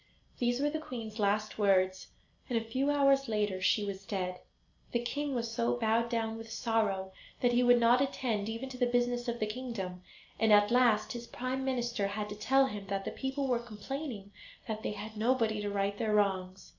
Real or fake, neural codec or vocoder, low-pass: real; none; 7.2 kHz